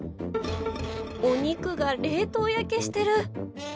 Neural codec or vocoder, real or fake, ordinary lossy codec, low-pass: none; real; none; none